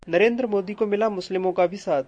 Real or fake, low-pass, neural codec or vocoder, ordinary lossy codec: real; 9.9 kHz; none; MP3, 48 kbps